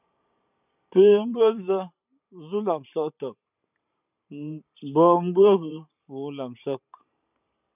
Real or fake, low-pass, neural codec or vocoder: fake; 3.6 kHz; vocoder, 44.1 kHz, 128 mel bands every 512 samples, BigVGAN v2